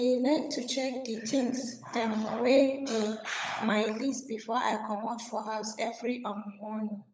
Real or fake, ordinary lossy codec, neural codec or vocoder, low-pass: fake; none; codec, 16 kHz, 16 kbps, FunCodec, trained on LibriTTS, 50 frames a second; none